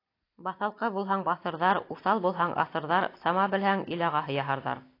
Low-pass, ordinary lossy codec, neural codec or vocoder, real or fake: 5.4 kHz; AAC, 32 kbps; none; real